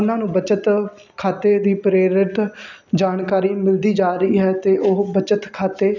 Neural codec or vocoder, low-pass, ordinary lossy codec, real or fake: none; 7.2 kHz; none; real